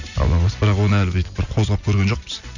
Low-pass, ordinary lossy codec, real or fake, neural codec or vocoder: 7.2 kHz; none; real; none